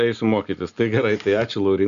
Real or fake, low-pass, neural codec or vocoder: real; 7.2 kHz; none